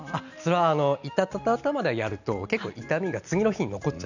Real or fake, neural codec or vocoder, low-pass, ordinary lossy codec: real; none; 7.2 kHz; none